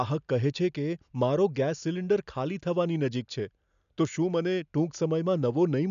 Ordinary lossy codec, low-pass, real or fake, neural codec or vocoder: none; 7.2 kHz; real; none